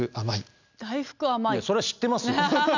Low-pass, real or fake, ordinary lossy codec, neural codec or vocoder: 7.2 kHz; real; none; none